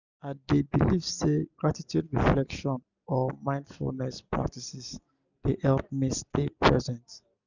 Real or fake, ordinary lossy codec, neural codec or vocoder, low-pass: real; none; none; 7.2 kHz